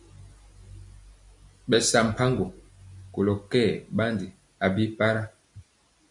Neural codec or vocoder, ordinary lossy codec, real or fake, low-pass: none; MP3, 64 kbps; real; 10.8 kHz